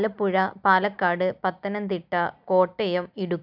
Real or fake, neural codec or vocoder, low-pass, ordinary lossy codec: real; none; 5.4 kHz; none